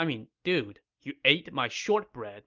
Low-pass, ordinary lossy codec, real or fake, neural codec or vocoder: 7.2 kHz; Opus, 24 kbps; real; none